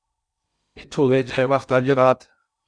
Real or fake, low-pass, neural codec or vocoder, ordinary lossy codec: fake; 9.9 kHz; codec, 16 kHz in and 24 kHz out, 0.6 kbps, FocalCodec, streaming, 2048 codes; Opus, 64 kbps